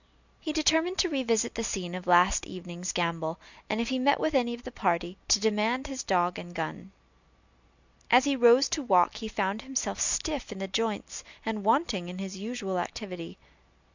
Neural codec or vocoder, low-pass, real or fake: none; 7.2 kHz; real